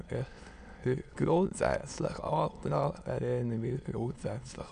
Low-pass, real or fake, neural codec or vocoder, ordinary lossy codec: 9.9 kHz; fake; autoencoder, 22.05 kHz, a latent of 192 numbers a frame, VITS, trained on many speakers; none